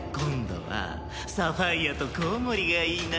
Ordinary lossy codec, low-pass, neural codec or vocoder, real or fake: none; none; none; real